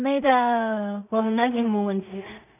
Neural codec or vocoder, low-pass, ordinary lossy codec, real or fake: codec, 16 kHz in and 24 kHz out, 0.4 kbps, LongCat-Audio-Codec, two codebook decoder; 3.6 kHz; none; fake